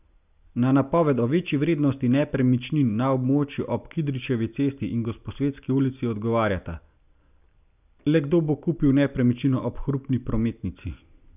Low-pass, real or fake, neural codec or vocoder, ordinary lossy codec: 3.6 kHz; real; none; none